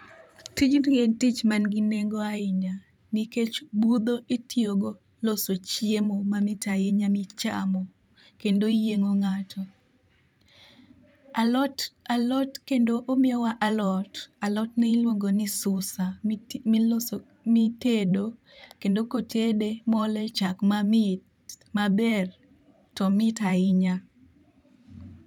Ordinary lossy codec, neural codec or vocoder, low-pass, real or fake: none; vocoder, 44.1 kHz, 128 mel bands every 512 samples, BigVGAN v2; 19.8 kHz; fake